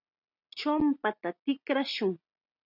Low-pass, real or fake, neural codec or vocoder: 5.4 kHz; real; none